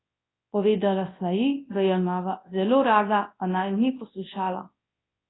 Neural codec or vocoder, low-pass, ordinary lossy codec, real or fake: codec, 24 kHz, 0.9 kbps, WavTokenizer, large speech release; 7.2 kHz; AAC, 16 kbps; fake